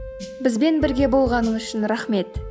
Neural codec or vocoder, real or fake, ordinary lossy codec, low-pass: none; real; none; none